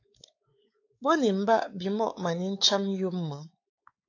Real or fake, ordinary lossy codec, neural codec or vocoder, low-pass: fake; AAC, 48 kbps; codec, 16 kHz, 4 kbps, X-Codec, WavLM features, trained on Multilingual LibriSpeech; 7.2 kHz